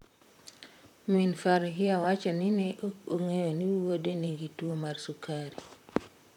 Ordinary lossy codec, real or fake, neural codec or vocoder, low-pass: none; fake; vocoder, 44.1 kHz, 128 mel bands, Pupu-Vocoder; 19.8 kHz